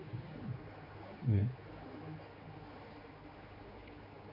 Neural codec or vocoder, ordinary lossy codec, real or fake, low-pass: codec, 16 kHz, 4 kbps, X-Codec, HuBERT features, trained on general audio; MP3, 32 kbps; fake; 5.4 kHz